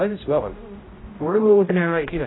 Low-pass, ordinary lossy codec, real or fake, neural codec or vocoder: 7.2 kHz; AAC, 16 kbps; fake; codec, 16 kHz, 0.5 kbps, X-Codec, HuBERT features, trained on general audio